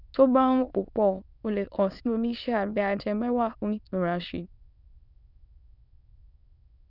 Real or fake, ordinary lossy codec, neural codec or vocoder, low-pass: fake; none; autoencoder, 22.05 kHz, a latent of 192 numbers a frame, VITS, trained on many speakers; 5.4 kHz